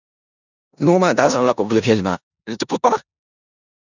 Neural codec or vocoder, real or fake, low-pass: codec, 16 kHz in and 24 kHz out, 0.9 kbps, LongCat-Audio-Codec, four codebook decoder; fake; 7.2 kHz